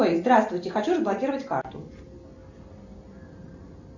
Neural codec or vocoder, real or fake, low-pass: none; real; 7.2 kHz